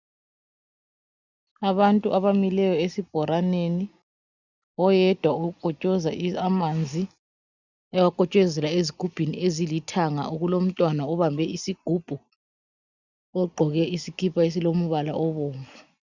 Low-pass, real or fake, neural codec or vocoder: 7.2 kHz; real; none